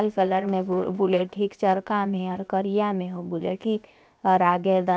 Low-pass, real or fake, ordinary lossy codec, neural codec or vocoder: none; fake; none; codec, 16 kHz, 0.7 kbps, FocalCodec